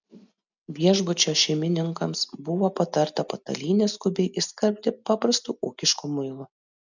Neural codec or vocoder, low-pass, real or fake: none; 7.2 kHz; real